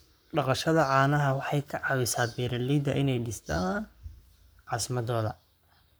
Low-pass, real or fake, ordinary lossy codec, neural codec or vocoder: none; fake; none; codec, 44.1 kHz, 7.8 kbps, Pupu-Codec